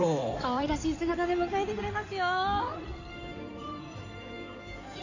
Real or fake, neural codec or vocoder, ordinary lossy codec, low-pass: fake; codec, 16 kHz in and 24 kHz out, 2.2 kbps, FireRedTTS-2 codec; none; 7.2 kHz